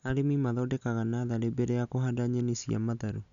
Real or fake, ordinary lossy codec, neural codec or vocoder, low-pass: real; none; none; 7.2 kHz